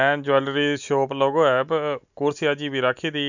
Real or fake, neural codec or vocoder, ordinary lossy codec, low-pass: real; none; none; 7.2 kHz